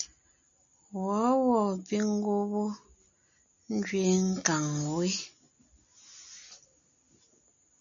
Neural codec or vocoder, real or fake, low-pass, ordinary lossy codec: none; real; 7.2 kHz; MP3, 96 kbps